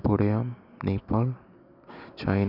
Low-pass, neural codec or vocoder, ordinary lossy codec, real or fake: 5.4 kHz; none; none; real